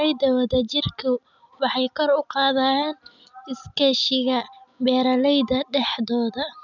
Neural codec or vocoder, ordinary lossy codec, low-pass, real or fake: none; none; 7.2 kHz; real